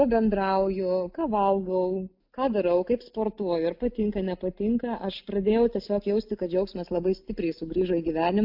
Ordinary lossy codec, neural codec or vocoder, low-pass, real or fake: AAC, 48 kbps; none; 5.4 kHz; real